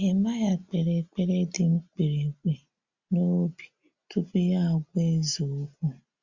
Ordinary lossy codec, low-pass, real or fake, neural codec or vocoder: Opus, 64 kbps; 7.2 kHz; real; none